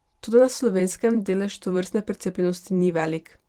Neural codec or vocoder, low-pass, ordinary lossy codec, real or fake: vocoder, 44.1 kHz, 128 mel bands every 512 samples, BigVGAN v2; 19.8 kHz; Opus, 16 kbps; fake